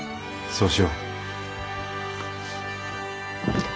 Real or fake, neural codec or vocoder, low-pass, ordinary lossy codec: real; none; none; none